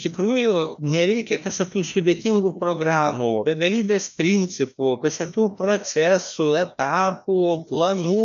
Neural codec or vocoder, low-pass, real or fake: codec, 16 kHz, 1 kbps, FreqCodec, larger model; 7.2 kHz; fake